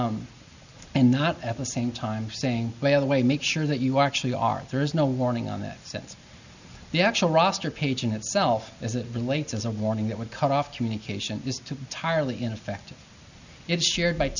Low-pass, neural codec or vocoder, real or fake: 7.2 kHz; none; real